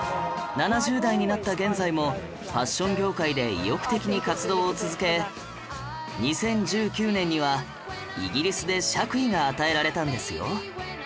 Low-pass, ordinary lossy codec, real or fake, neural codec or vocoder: none; none; real; none